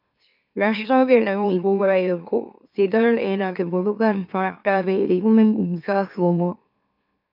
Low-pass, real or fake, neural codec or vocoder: 5.4 kHz; fake; autoencoder, 44.1 kHz, a latent of 192 numbers a frame, MeloTTS